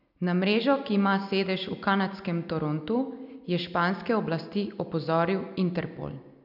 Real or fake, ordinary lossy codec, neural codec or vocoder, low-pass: real; none; none; 5.4 kHz